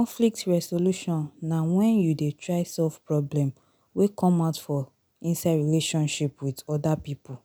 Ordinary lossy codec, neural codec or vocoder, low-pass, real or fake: none; none; none; real